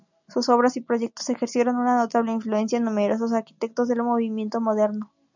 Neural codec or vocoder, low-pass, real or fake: none; 7.2 kHz; real